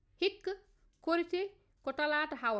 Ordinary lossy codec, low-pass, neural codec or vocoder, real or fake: none; none; none; real